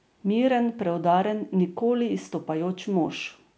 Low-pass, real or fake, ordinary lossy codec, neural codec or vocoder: none; real; none; none